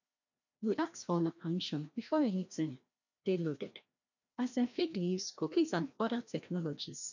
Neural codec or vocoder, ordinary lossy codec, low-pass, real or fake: codec, 16 kHz, 1 kbps, FreqCodec, larger model; none; 7.2 kHz; fake